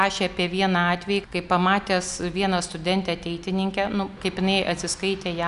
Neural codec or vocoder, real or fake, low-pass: none; real; 10.8 kHz